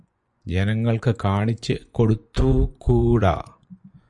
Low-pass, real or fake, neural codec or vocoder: 10.8 kHz; fake; vocoder, 24 kHz, 100 mel bands, Vocos